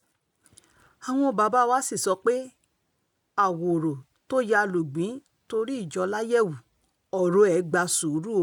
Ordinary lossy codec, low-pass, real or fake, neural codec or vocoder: none; 19.8 kHz; real; none